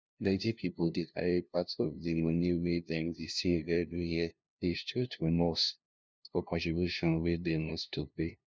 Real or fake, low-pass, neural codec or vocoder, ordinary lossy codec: fake; none; codec, 16 kHz, 0.5 kbps, FunCodec, trained on LibriTTS, 25 frames a second; none